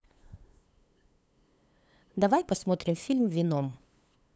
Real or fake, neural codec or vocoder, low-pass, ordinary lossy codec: fake; codec, 16 kHz, 8 kbps, FunCodec, trained on LibriTTS, 25 frames a second; none; none